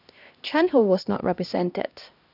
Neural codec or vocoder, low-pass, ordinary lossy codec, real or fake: codec, 16 kHz, 1 kbps, X-Codec, HuBERT features, trained on LibriSpeech; 5.4 kHz; none; fake